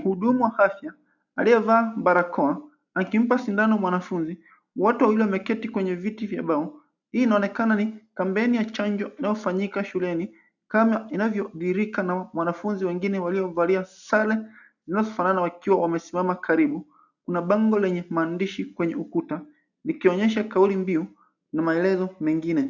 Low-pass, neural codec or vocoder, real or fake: 7.2 kHz; none; real